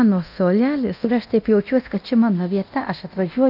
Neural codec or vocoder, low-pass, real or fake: codec, 24 kHz, 0.9 kbps, DualCodec; 5.4 kHz; fake